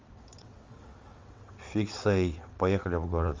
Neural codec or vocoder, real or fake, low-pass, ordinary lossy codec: vocoder, 22.05 kHz, 80 mel bands, WaveNeXt; fake; 7.2 kHz; Opus, 32 kbps